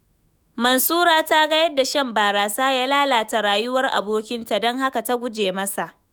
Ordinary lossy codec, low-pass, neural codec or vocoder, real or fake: none; none; autoencoder, 48 kHz, 128 numbers a frame, DAC-VAE, trained on Japanese speech; fake